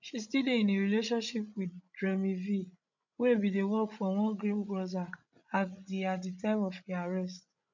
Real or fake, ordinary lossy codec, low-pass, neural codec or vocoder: fake; none; 7.2 kHz; codec, 16 kHz, 16 kbps, FreqCodec, larger model